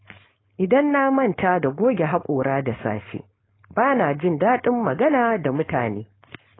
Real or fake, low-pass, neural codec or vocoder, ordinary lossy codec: fake; 7.2 kHz; codec, 16 kHz, 4.8 kbps, FACodec; AAC, 16 kbps